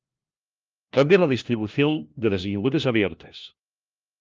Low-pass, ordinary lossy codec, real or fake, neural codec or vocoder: 7.2 kHz; Opus, 24 kbps; fake; codec, 16 kHz, 1 kbps, FunCodec, trained on LibriTTS, 50 frames a second